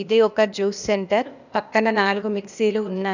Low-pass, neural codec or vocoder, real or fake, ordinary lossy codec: 7.2 kHz; codec, 16 kHz, 0.8 kbps, ZipCodec; fake; none